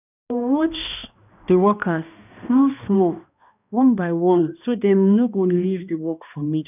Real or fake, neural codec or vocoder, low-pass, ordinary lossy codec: fake; codec, 16 kHz, 1 kbps, X-Codec, HuBERT features, trained on balanced general audio; 3.6 kHz; none